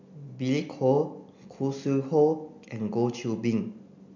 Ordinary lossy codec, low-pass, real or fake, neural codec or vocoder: none; 7.2 kHz; real; none